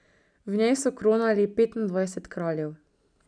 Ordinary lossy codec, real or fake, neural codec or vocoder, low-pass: none; real; none; 9.9 kHz